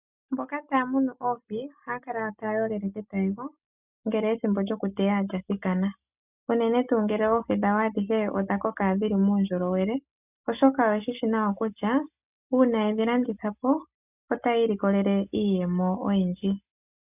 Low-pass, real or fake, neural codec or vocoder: 3.6 kHz; real; none